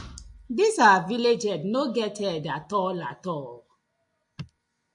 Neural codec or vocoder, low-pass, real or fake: none; 10.8 kHz; real